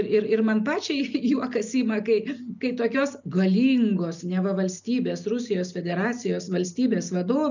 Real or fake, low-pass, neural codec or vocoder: real; 7.2 kHz; none